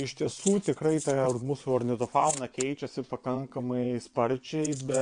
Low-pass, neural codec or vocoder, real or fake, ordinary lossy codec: 9.9 kHz; vocoder, 22.05 kHz, 80 mel bands, WaveNeXt; fake; MP3, 64 kbps